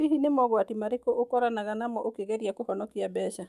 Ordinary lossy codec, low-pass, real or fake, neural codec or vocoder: none; 14.4 kHz; fake; autoencoder, 48 kHz, 128 numbers a frame, DAC-VAE, trained on Japanese speech